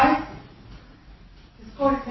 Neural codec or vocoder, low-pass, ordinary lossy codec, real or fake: none; 7.2 kHz; MP3, 24 kbps; real